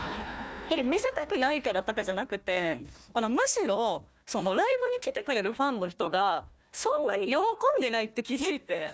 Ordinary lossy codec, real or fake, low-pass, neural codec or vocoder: none; fake; none; codec, 16 kHz, 1 kbps, FunCodec, trained on Chinese and English, 50 frames a second